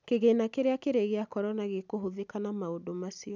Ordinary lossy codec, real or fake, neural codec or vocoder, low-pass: none; real; none; 7.2 kHz